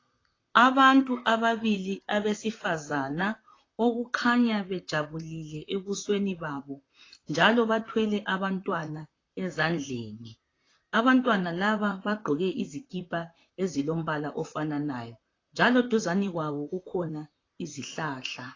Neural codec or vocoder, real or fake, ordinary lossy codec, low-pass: vocoder, 44.1 kHz, 128 mel bands, Pupu-Vocoder; fake; AAC, 32 kbps; 7.2 kHz